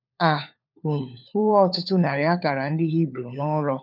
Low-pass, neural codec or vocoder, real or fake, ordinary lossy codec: 5.4 kHz; codec, 16 kHz, 4 kbps, FunCodec, trained on LibriTTS, 50 frames a second; fake; none